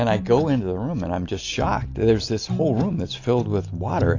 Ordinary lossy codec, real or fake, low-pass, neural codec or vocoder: AAC, 48 kbps; real; 7.2 kHz; none